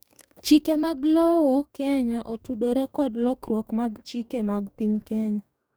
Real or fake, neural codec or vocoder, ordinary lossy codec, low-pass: fake; codec, 44.1 kHz, 2.6 kbps, DAC; none; none